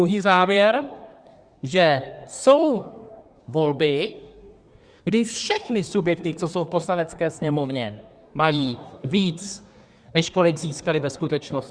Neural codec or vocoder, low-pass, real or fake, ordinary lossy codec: codec, 24 kHz, 1 kbps, SNAC; 9.9 kHz; fake; Opus, 64 kbps